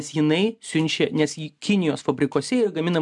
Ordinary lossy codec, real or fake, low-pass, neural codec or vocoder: MP3, 96 kbps; real; 10.8 kHz; none